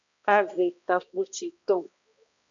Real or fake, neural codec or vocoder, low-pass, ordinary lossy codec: fake; codec, 16 kHz, 1 kbps, X-Codec, HuBERT features, trained on balanced general audio; 7.2 kHz; MP3, 96 kbps